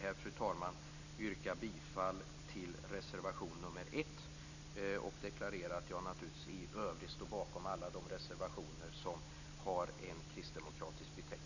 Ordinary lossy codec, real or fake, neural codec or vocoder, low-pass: none; real; none; 7.2 kHz